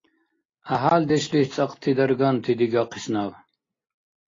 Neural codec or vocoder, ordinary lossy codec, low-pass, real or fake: none; AAC, 32 kbps; 7.2 kHz; real